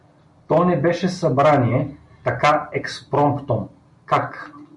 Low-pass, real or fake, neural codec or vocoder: 10.8 kHz; real; none